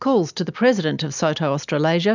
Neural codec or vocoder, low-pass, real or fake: none; 7.2 kHz; real